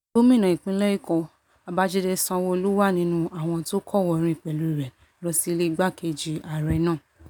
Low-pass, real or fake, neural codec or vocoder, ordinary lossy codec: 19.8 kHz; real; none; none